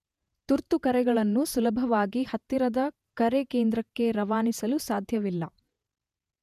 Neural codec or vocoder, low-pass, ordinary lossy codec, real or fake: vocoder, 48 kHz, 128 mel bands, Vocos; 14.4 kHz; none; fake